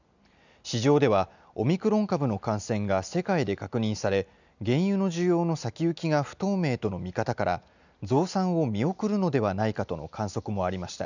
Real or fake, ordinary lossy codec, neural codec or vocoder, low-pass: real; none; none; 7.2 kHz